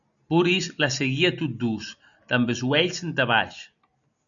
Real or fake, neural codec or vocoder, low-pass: real; none; 7.2 kHz